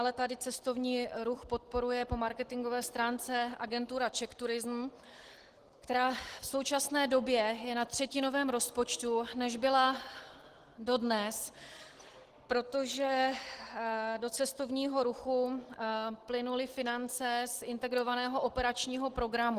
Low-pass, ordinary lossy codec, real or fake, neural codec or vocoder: 14.4 kHz; Opus, 16 kbps; real; none